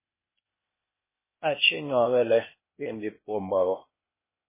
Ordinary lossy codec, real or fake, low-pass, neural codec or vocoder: MP3, 16 kbps; fake; 3.6 kHz; codec, 16 kHz, 0.8 kbps, ZipCodec